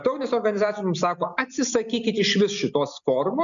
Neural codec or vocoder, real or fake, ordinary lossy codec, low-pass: none; real; MP3, 96 kbps; 7.2 kHz